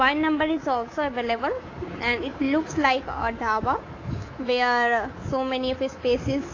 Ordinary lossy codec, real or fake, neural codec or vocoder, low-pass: AAC, 32 kbps; fake; codec, 24 kHz, 3.1 kbps, DualCodec; 7.2 kHz